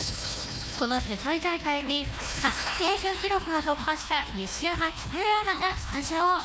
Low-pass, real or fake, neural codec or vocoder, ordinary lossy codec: none; fake; codec, 16 kHz, 1 kbps, FunCodec, trained on Chinese and English, 50 frames a second; none